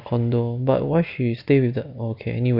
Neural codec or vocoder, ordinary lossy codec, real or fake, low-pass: none; none; real; 5.4 kHz